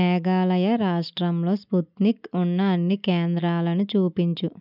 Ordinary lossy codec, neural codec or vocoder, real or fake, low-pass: none; none; real; 5.4 kHz